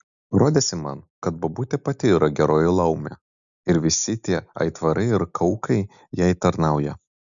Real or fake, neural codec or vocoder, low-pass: real; none; 7.2 kHz